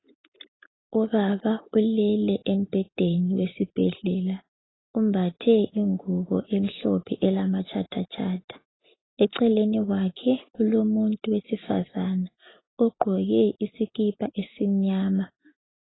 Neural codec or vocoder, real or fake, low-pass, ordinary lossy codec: none; real; 7.2 kHz; AAC, 16 kbps